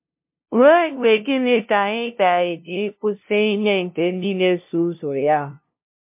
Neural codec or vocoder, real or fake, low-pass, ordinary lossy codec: codec, 16 kHz, 0.5 kbps, FunCodec, trained on LibriTTS, 25 frames a second; fake; 3.6 kHz; MP3, 32 kbps